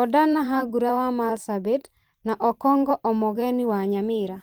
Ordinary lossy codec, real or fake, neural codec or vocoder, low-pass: Opus, 24 kbps; fake; vocoder, 44.1 kHz, 128 mel bands every 512 samples, BigVGAN v2; 19.8 kHz